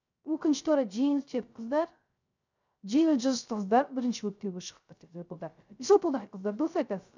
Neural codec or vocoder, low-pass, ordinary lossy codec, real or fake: codec, 16 kHz, 0.3 kbps, FocalCodec; 7.2 kHz; none; fake